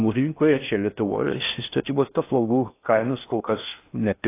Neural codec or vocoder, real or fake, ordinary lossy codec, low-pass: codec, 16 kHz in and 24 kHz out, 0.6 kbps, FocalCodec, streaming, 4096 codes; fake; AAC, 24 kbps; 3.6 kHz